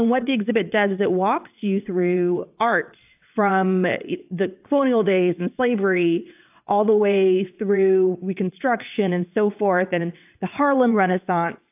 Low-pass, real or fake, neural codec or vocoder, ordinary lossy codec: 3.6 kHz; fake; vocoder, 22.05 kHz, 80 mel bands, WaveNeXt; AAC, 32 kbps